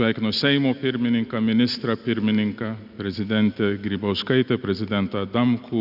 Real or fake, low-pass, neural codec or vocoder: real; 5.4 kHz; none